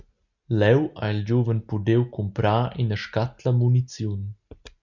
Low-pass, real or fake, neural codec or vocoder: 7.2 kHz; real; none